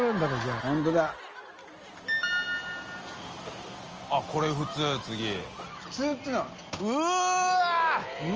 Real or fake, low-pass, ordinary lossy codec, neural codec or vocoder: real; 7.2 kHz; Opus, 24 kbps; none